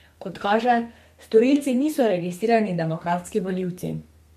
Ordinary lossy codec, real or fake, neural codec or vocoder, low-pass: MP3, 64 kbps; fake; codec, 32 kHz, 1.9 kbps, SNAC; 14.4 kHz